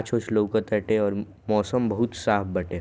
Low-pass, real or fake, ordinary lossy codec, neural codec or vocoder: none; real; none; none